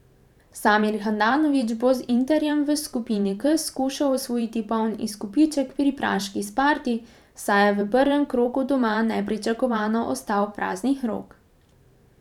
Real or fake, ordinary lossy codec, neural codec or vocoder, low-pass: fake; none; vocoder, 44.1 kHz, 128 mel bands every 512 samples, BigVGAN v2; 19.8 kHz